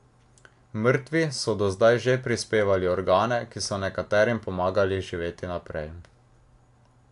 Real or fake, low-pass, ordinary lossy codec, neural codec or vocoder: real; 10.8 kHz; AAC, 64 kbps; none